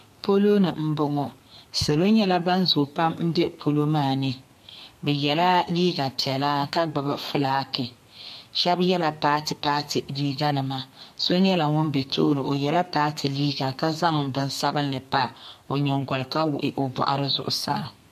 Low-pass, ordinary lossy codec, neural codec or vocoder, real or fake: 14.4 kHz; MP3, 64 kbps; codec, 32 kHz, 1.9 kbps, SNAC; fake